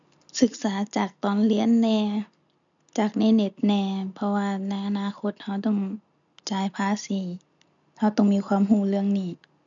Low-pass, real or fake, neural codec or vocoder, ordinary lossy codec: 7.2 kHz; real; none; none